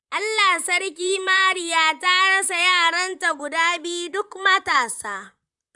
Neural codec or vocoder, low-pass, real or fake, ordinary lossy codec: vocoder, 44.1 kHz, 128 mel bands, Pupu-Vocoder; 10.8 kHz; fake; none